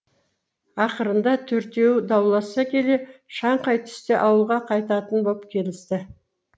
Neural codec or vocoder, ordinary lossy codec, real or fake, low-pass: none; none; real; none